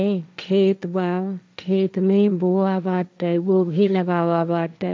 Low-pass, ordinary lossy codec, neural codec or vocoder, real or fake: none; none; codec, 16 kHz, 1.1 kbps, Voila-Tokenizer; fake